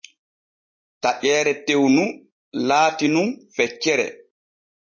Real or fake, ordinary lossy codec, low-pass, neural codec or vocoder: real; MP3, 32 kbps; 7.2 kHz; none